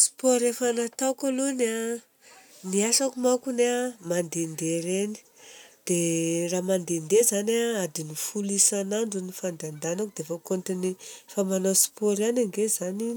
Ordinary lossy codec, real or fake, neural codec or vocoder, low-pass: none; real; none; none